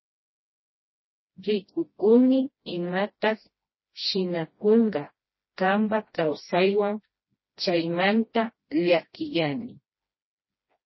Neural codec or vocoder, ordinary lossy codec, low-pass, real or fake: codec, 16 kHz, 1 kbps, FreqCodec, smaller model; MP3, 24 kbps; 7.2 kHz; fake